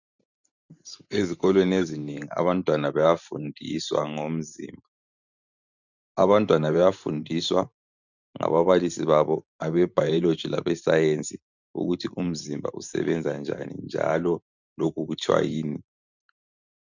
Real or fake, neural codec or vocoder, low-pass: fake; vocoder, 44.1 kHz, 128 mel bands every 512 samples, BigVGAN v2; 7.2 kHz